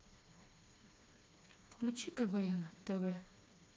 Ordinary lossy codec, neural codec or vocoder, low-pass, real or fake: none; codec, 16 kHz, 2 kbps, FreqCodec, smaller model; none; fake